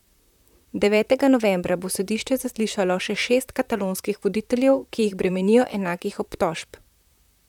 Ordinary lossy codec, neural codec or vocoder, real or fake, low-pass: none; vocoder, 44.1 kHz, 128 mel bands, Pupu-Vocoder; fake; 19.8 kHz